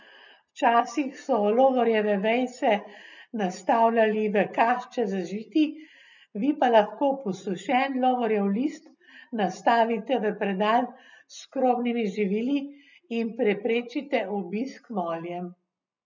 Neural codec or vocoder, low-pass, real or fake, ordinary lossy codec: none; 7.2 kHz; real; none